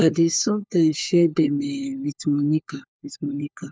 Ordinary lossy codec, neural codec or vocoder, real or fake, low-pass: none; codec, 16 kHz, 4 kbps, FunCodec, trained on LibriTTS, 50 frames a second; fake; none